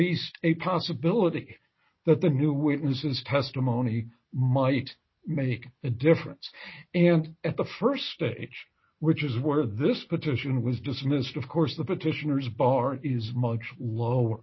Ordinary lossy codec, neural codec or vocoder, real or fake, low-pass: MP3, 24 kbps; none; real; 7.2 kHz